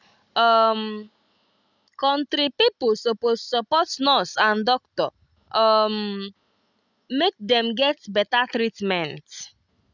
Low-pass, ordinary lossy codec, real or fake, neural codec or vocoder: 7.2 kHz; none; real; none